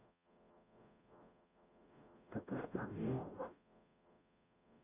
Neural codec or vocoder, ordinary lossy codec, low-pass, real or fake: codec, 44.1 kHz, 0.9 kbps, DAC; none; 3.6 kHz; fake